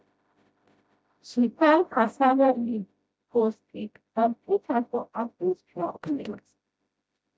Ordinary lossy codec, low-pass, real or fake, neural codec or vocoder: none; none; fake; codec, 16 kHz, 0.5 kbps, FreqCodec, smaller model